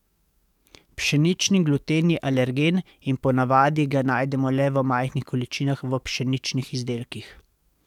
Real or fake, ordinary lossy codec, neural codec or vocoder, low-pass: fake; none; codec, 44.1 kHz, 7.8 kbps, DAC; 19.8 kHz